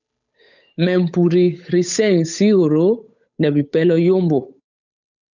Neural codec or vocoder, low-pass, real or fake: codec, 16 kHz, 8 kbps, FunCodec, trained on Chinese and English, 25 frames a second; 7.2 kHz; fake